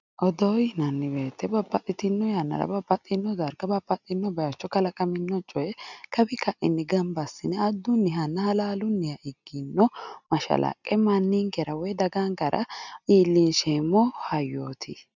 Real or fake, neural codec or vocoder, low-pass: real; none; 7.2 kHz